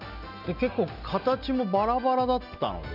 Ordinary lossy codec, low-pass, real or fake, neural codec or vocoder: none; 5.4 kHz; real; none